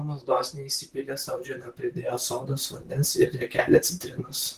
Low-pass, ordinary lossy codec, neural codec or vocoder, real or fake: 14.4 kHz; Opus, 16 kbps; vocoder, 44.1 kHz, 128 mel bands, Pupu-Vocoder; fake